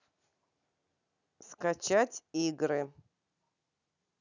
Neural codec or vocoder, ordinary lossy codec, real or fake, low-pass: none; none; real; 7.2 kHz